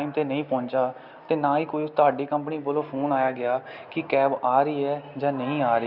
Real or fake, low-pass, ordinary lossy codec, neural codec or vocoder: real; 5.4 kHz; Opus, 64 kbps; none